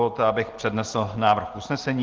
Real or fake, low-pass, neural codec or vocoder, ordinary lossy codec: real; 7.2 kHz; none; Opus, 16 kbps